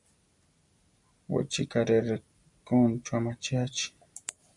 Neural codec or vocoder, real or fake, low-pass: none; real; 10.8 kHz